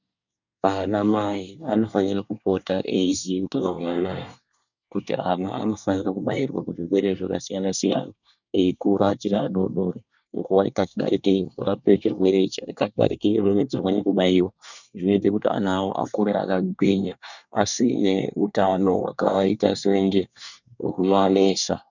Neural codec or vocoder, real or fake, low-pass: codec, 24 kHz, 1 kbps, SNAC; fake; 7.2 kHz